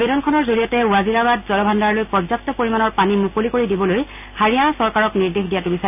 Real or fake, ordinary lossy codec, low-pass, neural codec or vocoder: real; none; 3.6 kHz; none